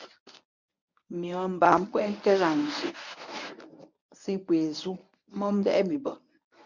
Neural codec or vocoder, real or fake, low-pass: codec, 24 kHz, 0.9 kbps, WavTokenizer, medium speech release version 1; fake; 7.2 kHz